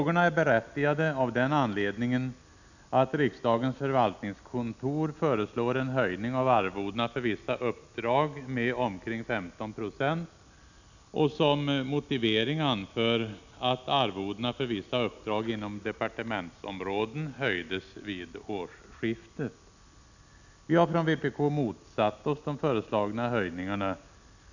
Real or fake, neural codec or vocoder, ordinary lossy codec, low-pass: real; none; none; 7.2 kHz